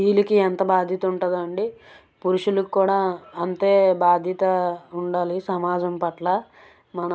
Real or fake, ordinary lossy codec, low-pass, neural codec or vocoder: real; none; none; none